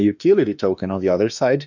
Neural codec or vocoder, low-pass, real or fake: autoencoder, 48 kHz, 32 numbers a frame, DAC-VAE, trained on Japanese speech; 7.2 kHz; fake